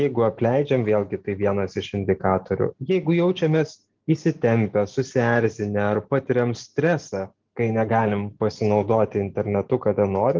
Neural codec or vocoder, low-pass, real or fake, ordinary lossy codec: none; 7.2 kHz; real; Opus, 32 kbps